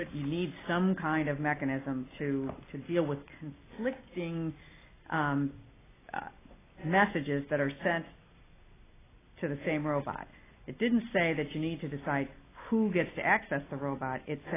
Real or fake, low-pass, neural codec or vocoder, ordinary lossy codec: real; 3.6 kHz; none; AAC, 16 kbps